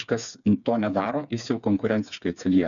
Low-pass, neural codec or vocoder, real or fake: 7.2 kHz; codec, 16 kHz, 4 kbps, FreqCodec, smaller model; fake